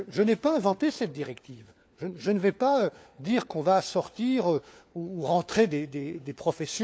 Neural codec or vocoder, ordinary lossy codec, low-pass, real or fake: codec, 16 kHz, 4 kbps, FunCodec, trained on LibriTTS, 50 frames a second; none; none; fake